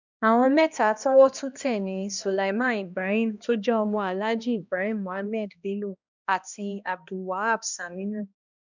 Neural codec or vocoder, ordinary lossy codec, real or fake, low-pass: codec, 16 kHz, 1 kbps, X-Codec, HuBERT features, trained on balanced general audio; none; fake; 7.2 kHz